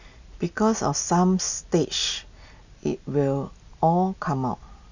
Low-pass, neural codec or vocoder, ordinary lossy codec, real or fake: 7.2 kHz; none; none; real